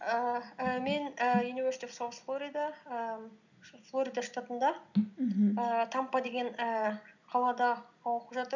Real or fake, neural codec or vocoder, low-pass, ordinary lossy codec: real; none; 7.2 kHz; none